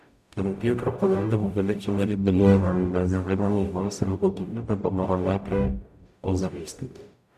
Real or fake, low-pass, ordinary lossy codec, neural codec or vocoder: fake; 14.4 kHz; none; codec, 44.1 kHz, 0.9 kbps, DAC